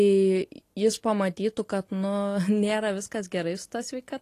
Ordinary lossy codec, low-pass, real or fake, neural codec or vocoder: AAC, 48 kbps; 14.4 kHz; real; none